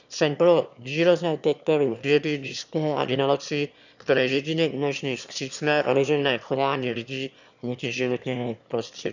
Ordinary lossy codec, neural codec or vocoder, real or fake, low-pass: none; autoencoder, 22.05 kHz, a latent of 192 numbers a frame, VITS, trained on one speaker; fake; 7.2 kHz